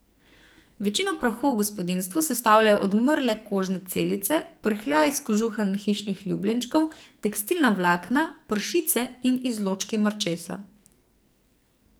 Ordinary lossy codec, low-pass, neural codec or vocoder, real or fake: none; none; codec, 44.1 kHz, 2.6 kbps, SNAC; fake